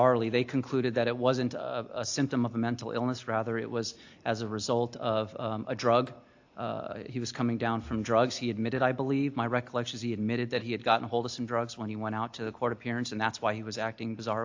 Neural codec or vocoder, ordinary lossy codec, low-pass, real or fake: none; AAC, 48 kbps; 7.2 kHz; real